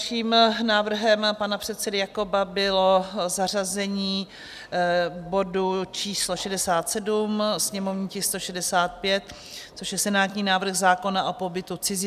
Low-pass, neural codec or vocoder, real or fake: 14.4 kHz; none; real